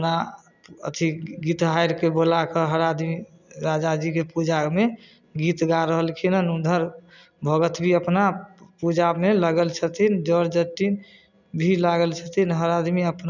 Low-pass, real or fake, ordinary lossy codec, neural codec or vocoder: 7.2 kHz; real; none; none